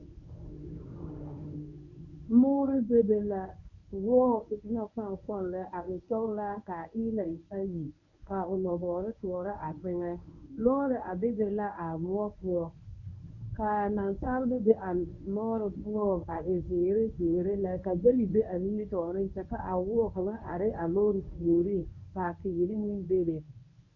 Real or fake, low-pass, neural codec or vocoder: fake; 7.2 kHz; codec, 24 kHz, 0.9 kbps, WavTokenizer, medium speech release version 1